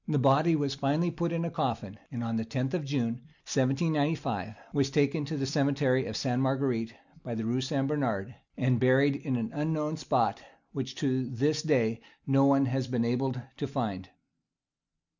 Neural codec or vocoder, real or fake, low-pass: none; real; 7.2 kHz